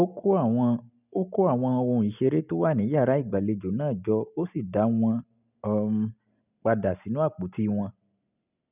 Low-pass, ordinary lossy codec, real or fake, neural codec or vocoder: 3.6 kHz; none; real; none